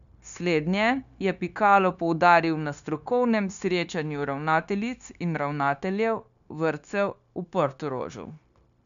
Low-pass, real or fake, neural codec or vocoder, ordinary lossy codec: 7.2 kHz; fake; codec, 16 kHz, 0.9 kbps, LongCat-Audio-Codec; none